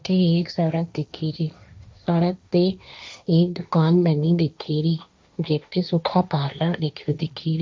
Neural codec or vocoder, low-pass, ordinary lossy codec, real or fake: codec, 16 kHz, 1.1 kbps, Voila-Tokenizer; none; none; fake